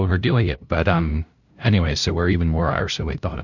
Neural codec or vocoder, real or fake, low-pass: codec, 16 kHz, 0.5 kbps, FunCodec, trained on LibriTTS, 25 frames a second; fake; 7.2 kHz